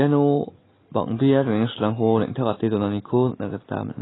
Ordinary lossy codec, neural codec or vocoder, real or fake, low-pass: AAC, 16 kbps; none; real; 7.2 kHz